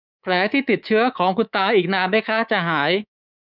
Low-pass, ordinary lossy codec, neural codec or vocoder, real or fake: 5.4 kHz; none; codec, 24 kHz, 3.1 kbps, DualCodec; fake